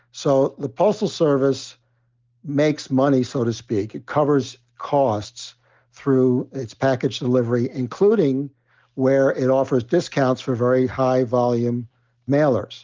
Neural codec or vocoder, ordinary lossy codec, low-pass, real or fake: none; Opus, 32 kbps; 7.2 kHz; real